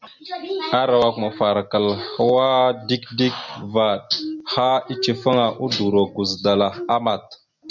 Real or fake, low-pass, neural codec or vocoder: real; 7.2 kHz; none